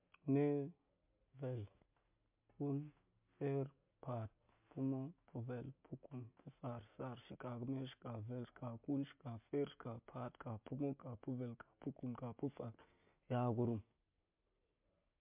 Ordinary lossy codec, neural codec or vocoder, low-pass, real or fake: MP3, 32 kbps; none; 3.6 kHz; real